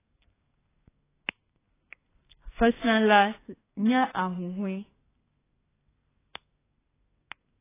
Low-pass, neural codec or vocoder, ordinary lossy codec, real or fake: 3.6 kHz; codec, 32 kHz, 1.9 kbps, SNAC; AAC, 16 kbps; fake